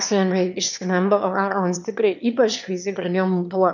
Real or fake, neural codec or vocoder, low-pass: fake; autoencoder, 22.05 kHz, a latent of 192 numbers a frame, VITS, trained on one speaker; 7.2 kHz